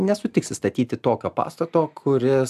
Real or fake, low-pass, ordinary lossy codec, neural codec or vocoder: real; 14.4 kHz; AAC, 96 kbps; none